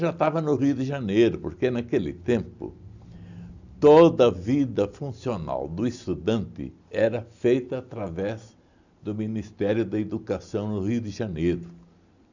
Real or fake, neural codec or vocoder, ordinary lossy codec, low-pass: real; none; none; 7.2 kHz